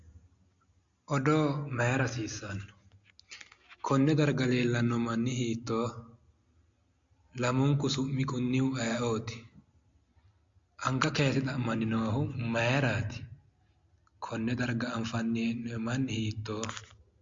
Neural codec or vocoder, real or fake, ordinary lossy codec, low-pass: none; real; MP3, 48 kbps; 7.2 kHz